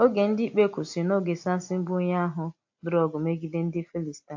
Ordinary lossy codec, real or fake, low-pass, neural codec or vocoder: AAC, 48 kbps; real; 7.2 kHz; none